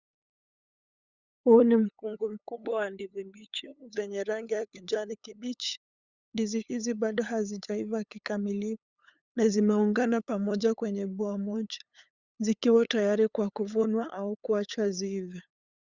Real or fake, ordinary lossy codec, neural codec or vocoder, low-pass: fake; Opus, 64 kbps; codec, 16 kHz, 8 kbps, FunCodec, trained on LibriTTS, 25 frames a second; 7.2 kHz